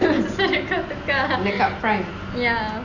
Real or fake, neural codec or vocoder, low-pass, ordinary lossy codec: real; none; 7.2 kHz; none